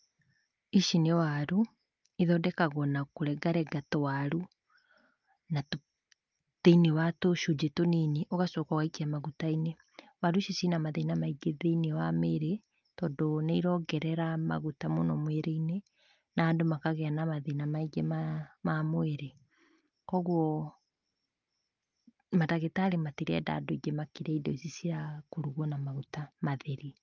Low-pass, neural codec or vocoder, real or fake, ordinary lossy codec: 7.2 kHz; none; real; Opus, 24 kbps